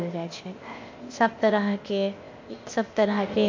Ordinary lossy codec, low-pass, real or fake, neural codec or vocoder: MP3, 48 kbps; 7.2 kHz; fake; codec, 16 kHz, 0.8 kbps, ZipCodec